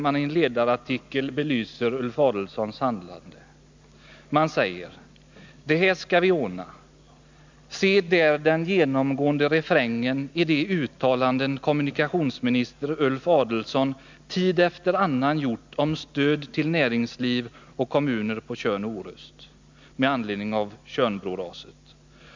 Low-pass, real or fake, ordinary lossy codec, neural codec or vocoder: 7.2 kHz; real; MP3, 64 kbps; none